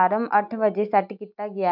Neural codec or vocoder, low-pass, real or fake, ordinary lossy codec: none; 5.4 kHz; real; none